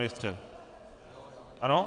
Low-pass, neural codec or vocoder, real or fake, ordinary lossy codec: 9.9 kHz; vocoder, 22.05 kHz, 80 mel bands, WaveNeXt; fake; AAC, 64 kbps